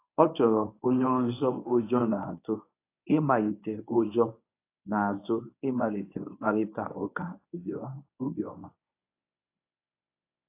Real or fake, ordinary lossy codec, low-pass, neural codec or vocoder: fake; AAC, 24 kbps; 3.6 kHz; codec, 24 kHz, 0.9 kbps, WavTokenizer, medium speech release version 1